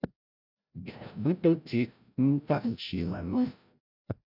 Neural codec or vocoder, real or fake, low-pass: codec, 16 kHz, 0.5 kbps, FreqCodec, larger model; fake; 5.4 kHz